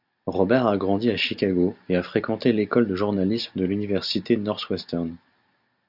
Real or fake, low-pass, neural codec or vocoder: real; 5.4 kHz; none